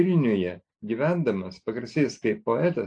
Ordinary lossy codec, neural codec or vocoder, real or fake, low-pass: AAC, 48 kbps; none; real; 9.9 kHz